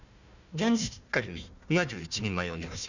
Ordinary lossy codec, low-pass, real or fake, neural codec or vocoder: none; 7.2 kHz; fake; codec, 16 kHz, 1 kbps, FunCodec, trained on Chinese and English, 50 frames a second